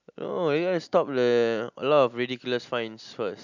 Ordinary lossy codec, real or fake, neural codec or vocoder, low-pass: none; real; none; 7.2 kHz